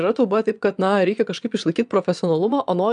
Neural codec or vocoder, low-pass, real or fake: vocoder, 22.05 kHz, 80 mel bands, Vocos; 9.9 kHz; fake